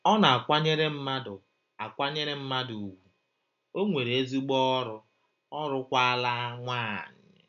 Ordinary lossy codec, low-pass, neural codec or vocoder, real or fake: none; 7.2 kHz; none; real